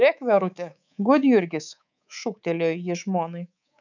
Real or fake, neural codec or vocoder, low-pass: fake; codec, 24 kHz, 3.1 kbps, DualCodec; 7.2 kHz